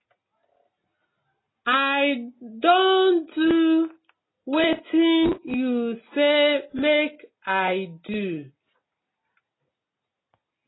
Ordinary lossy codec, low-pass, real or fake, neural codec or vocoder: AAC, 16 kbps; 7.2 kHz; real; none